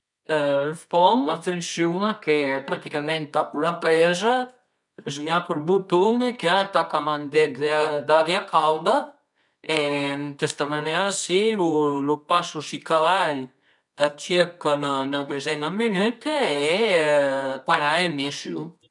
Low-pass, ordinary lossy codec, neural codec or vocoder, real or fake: 10.8 kHz; none; codec, 24 kHz, 0.9 kbps, WavTokenizer, medium music audio release; fake